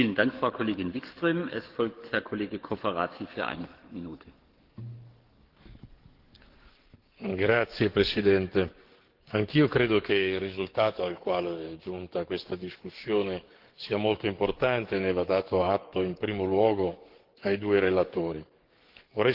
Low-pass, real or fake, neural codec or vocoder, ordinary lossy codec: 5.4 kHz; fake; codec, 44.1 kHz, 7.8 kbps, DAC; Opus, 16 kbps